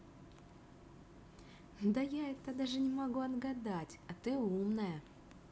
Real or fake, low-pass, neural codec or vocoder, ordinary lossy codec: real; none; none; none